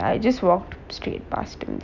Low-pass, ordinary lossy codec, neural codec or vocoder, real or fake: 7.2 kHz; none; none; real